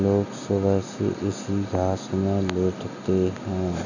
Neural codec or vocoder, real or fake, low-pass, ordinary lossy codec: none; real; 7.2 kHz; none